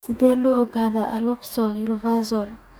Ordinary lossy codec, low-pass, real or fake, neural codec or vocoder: none; none; fake; codec, 44.1 kHz, 2.6 kbps, DAC